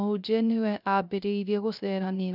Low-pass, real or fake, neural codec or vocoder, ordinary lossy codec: 5.4 kHz; fake; codec, 16 kHz, 0.3 kbps, FocalCodec; none